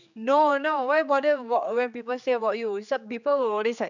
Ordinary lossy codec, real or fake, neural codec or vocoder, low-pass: none; fake; codec, 16 kHz, 4 kbps, X-Codec, HuBERT features, trained on general audio; 7.2 kHz